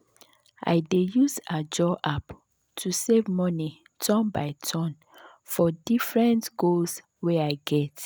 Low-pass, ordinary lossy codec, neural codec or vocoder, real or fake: none; none; none; real